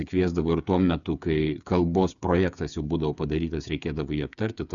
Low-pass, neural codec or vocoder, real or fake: 7.2 kHz; codec, 16 kHz, 8 kbps, FreqCodec, smaller model; fake